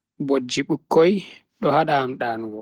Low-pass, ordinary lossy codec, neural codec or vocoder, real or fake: 19.8 kHz; Opus, 16 kbps; none; real